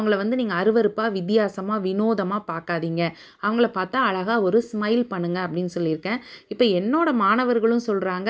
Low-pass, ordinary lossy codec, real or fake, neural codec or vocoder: none; none; real; none